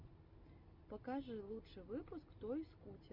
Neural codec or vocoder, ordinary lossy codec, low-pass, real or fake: none; MP3, 48 kbps; 5.4 kHz; real